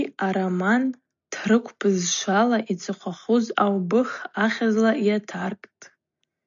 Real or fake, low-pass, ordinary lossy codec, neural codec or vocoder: real; 7.2 kHz; MP3, 64 kbps; none